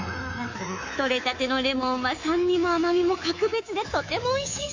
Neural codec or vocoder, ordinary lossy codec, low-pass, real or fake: codec, 24 kHz, 3.1 kbps, DualCodec; AAC, 48 kbps; 7.2 kHz; fake